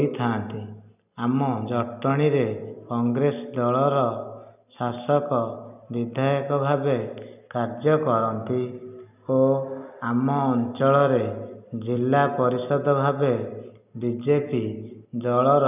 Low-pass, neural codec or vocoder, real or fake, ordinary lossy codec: 3.6 kHz; none; real; none